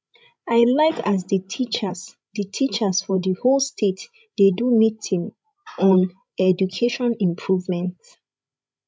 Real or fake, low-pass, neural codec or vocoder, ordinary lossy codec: fake; none; codec, 16 kHz, 16 kbps, FreqCodec, larger model; none